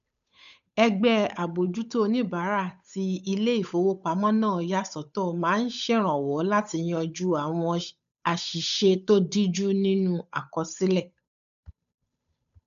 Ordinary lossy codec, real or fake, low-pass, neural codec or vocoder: none; fake; 7.2 kHz; codec, 16 kHz, 8 kbps, FunCodec, trained on Chinese and English, 25 frames a second